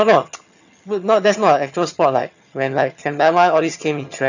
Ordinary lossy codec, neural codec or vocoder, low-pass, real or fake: none; vocoder, 22.05 kHz, 80 mel bands, HiFi-GAN; 7.2 kHz; fake